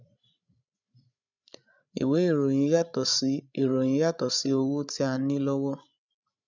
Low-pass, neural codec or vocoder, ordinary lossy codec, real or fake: 7.2 kHz; codec, 16 kHz, 16 kbps, FreqCodec, larger model; none; fake